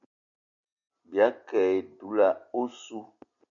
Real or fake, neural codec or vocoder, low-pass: real; none; 7.2 kHz